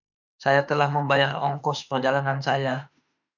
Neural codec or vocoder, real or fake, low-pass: autoencoder, 48 kHz, 32 numbers a frame, DAC-VAE, trained on Japanese speech; fake; 7.2 kHz